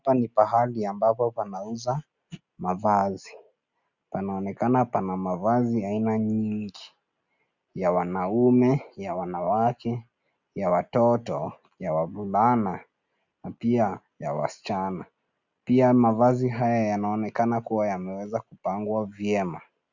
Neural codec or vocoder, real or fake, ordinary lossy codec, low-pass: none; real; Opus, 64 kbps; 7.2 kHz